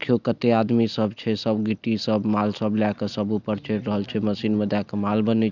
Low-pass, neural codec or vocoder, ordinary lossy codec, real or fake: 7.2 kHz; none; none; real